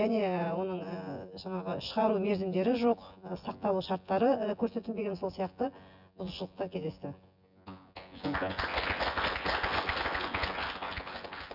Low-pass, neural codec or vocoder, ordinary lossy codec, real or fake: 5.4 kHz; vocoder, 24 kHz, 100 mel bands, Vocos; none; fake